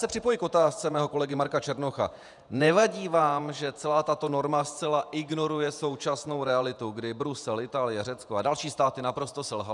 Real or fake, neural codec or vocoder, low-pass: fake; vocoder, 48 kHz, 128 mel bands, Vocos; 10.8 kHz